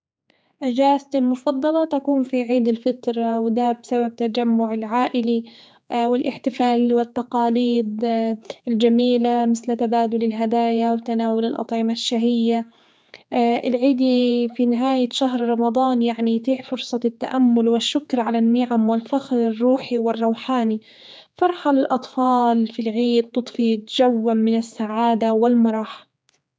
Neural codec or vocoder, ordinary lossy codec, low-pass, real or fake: codec, 16 kHz, 4 kbps, X-Codec, HuBERT features, trained on general audio; none; none; fake